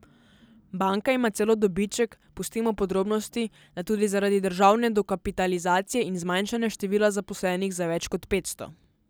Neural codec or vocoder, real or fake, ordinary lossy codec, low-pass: none; real; none; none